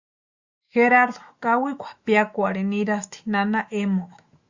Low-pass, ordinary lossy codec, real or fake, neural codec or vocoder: 7.2 kHz; Opus, 64 kbps; fake; autoencoder, 48 kHz, 128 numbers a frame, DAC-VAE, trained on Japanese speech